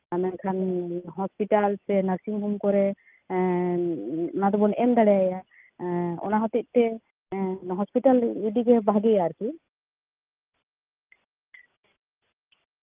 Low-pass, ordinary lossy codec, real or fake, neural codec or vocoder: 3.6 kHz; Opus, 24 kbps; real; none